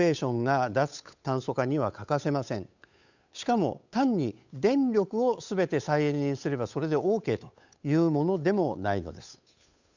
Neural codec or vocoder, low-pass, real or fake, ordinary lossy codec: codec, 16 kHz, 8 kbps, FunCodec, trained on Chinese and English, 25 frames a second; 7.2 kHz; fake; none